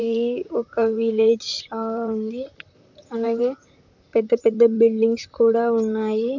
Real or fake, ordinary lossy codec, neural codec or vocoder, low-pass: fake; none; vocoder, 44.1 kHz, 128 mel bands, Pupu-Vocoder; 7.2 kHz